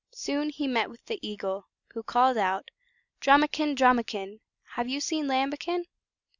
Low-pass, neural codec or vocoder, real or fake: 7.2 kHz; none; real